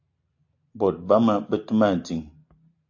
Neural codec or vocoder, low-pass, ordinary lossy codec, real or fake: none; 7.2 kHz; AAC, 48 kbps; real